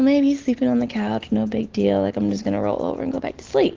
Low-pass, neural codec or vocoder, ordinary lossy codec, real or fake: 7.2 kHz; none; Opus, 16 kbps; real